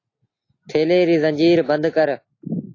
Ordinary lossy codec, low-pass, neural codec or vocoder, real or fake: AAC, 32 kbps; 7.2 kHz; none; real